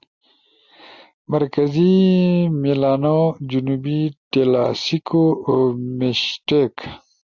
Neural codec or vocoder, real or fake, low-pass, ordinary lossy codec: none; real; 7.2 kHz; Opus, 64 kbps